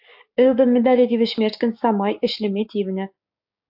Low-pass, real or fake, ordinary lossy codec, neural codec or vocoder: 5.4 kHz; fake; AAC, 48 kbps; codec, 16 kHz, 6 kbps, DAC